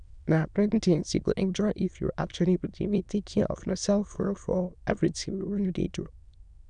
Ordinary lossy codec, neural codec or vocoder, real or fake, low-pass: none; autoencoder, 22.05 kHz, a latent of 192 numbers a frame, VITS, trained on many speakers; fake; 9.9 kHz